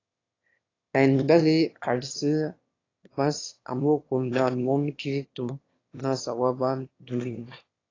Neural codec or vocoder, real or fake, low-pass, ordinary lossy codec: autoencoder, 22.05 kHz, a latent of 192 numbers a frame, VITS, trained on one speaker; fake; 7.2 kHz; AAC, 32 kbps